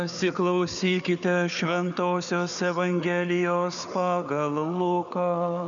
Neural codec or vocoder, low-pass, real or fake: codec, 16 kHz, 4 kbps, FunCodec, trained on Chinese and English, 50 frames a second; 7.2 kHz; fake